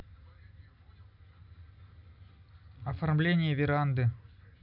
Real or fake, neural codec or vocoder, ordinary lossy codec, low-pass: real; none; none; 5.4 kHz